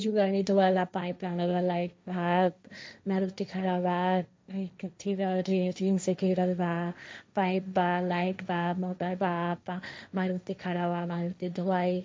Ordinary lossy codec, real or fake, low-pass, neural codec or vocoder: none; fake; none; codec, 16 kHz, 1.1 kbps, Voila-Tokenizer